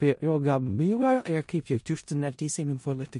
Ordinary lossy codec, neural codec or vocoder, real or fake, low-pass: MP3, 48 kbps; codec, 16 kHz in and 24 kHz out, 0.4 kbps, LongCat-Audio-Codec, four codebook decoder; fake; 10.8 kHz